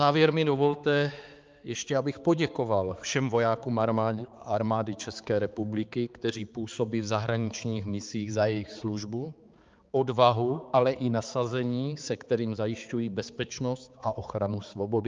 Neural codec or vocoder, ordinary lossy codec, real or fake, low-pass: codec, 16 kHz, 4 kbps, X-Codec, HuBERT features, trained on balanced general audio; Opus, 24 kbps; fake; 7.2 kHz